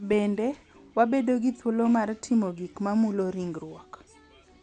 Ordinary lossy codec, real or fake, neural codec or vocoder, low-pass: none; real; none; 10.8 kHz